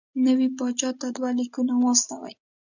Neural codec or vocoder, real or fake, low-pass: none; real; 7.2 kHz